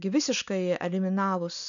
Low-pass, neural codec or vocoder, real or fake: 7.2 kHz; none; real